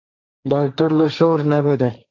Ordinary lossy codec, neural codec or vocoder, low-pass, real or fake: AAC, 48 kbps; codec, 16 kHz, 1.1 kbps, Voila-Tokenizer; 7.2 kHz; fake